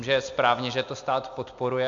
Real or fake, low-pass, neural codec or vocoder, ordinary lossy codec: real; 7.2 kHz; none; MP3, 64 kbps